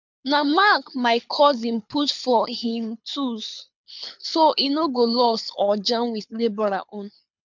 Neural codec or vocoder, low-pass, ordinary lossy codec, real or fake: codec, 24 kHz, 6 kbps, HILCodec; 7.2 kHz; MP3, 64 kbps; fake